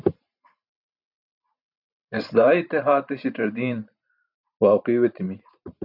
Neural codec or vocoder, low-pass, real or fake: vocoder, 44.1 kHz, 128 mel bands every 512 samples, BigVGAN v2; 5.4 kHz; fake